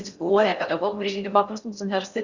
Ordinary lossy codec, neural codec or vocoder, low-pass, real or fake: Opus, 64 kbps; codec, 16 kHz in and 24 kHz out, 0.6 kbps, FocalCodec, streaming, 4096 codes; 7.2 kHz; fake